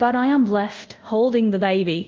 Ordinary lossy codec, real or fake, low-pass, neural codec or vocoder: Opus, 24 kbps; fake; 7.2 kHz; codec, 24 kHz, 0.5 kbps, DualCodec